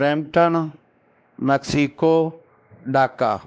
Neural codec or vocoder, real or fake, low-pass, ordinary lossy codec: codec, 16 kHz, 2 kbps, X-Codec, WavLM features, trained on Multilingual LibriSpeech; fake; none; none